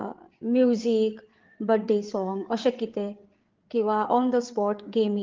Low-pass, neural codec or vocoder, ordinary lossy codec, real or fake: 7.2 kHz; codec, 16 kHz, 8 kbps, FreqCodec, larger model; Opus, 16 kbps; fake